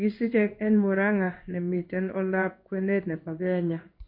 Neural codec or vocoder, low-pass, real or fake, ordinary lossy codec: codec, 16 kHz in and 24 kHz out, 1 kbps, XY-Tokenizer; 5.4 kHz; fake; MP3, 32 kbps